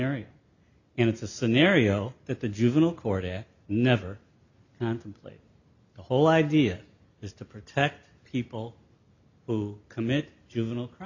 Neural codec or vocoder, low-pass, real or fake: none; 7.2 kHz; real